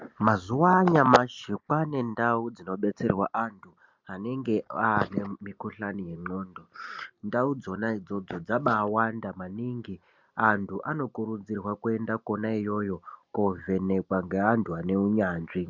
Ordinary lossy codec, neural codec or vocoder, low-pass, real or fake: MP3, 64 kbps; none; 7.2 kHz; real